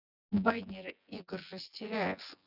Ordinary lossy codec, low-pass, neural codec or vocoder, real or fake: none; 5.4 kHz; vocoder, 24 kHz, 100 mel bands, Vocos; fake